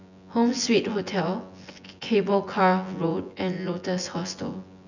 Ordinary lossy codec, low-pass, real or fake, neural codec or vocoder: none; 7.2 kHz; fake; vocoder, 24 kHz, 100 mel bands, Vocos